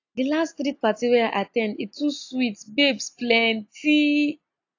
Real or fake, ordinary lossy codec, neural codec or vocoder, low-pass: real; AAC, 48 kbps; none; 7.2 kHz